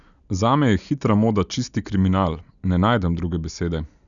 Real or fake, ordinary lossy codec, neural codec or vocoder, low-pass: real; none; none; 7.2 kHz